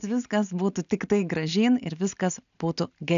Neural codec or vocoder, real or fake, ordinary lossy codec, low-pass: none; real; AAC, 96 kbps; 7.2 kHz